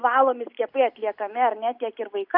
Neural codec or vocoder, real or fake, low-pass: none; real; 5.4 kHz